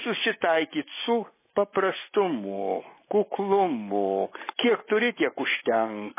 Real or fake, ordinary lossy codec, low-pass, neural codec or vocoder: real; MP3, 16 kbps; 3.6 kHz; none